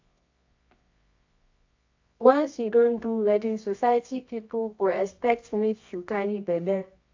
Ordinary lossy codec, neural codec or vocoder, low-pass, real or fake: AAC, 48 kbps; codec, 24 kHz, 0.9 kbps, WavTokenizer, medium music audio release; 7.2 kHz; fake